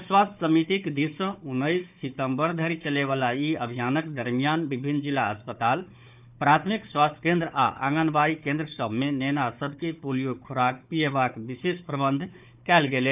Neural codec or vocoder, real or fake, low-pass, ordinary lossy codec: codec, 16 kHz, 4 kbps, FunCodec, trained on Chinese and English, 50 frames a second; fake; 3.6 kHz; none